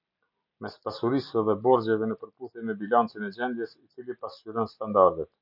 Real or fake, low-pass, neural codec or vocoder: real; 5.4 kHz; none